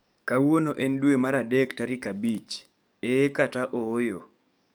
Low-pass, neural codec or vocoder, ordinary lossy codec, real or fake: none; codec, 44.1 kHz, 7.8 kbps, DAC; none; fake